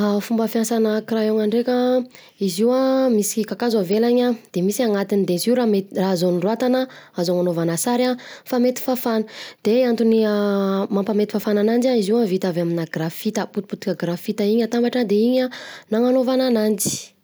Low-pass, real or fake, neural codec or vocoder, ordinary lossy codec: none; real; none; none